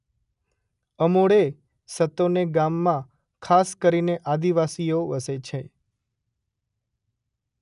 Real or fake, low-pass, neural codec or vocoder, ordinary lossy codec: real; 10.8 kHz; none; none